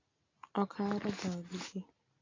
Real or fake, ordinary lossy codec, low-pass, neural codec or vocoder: real; AAC, 32 kbps; 7.2 kHz; none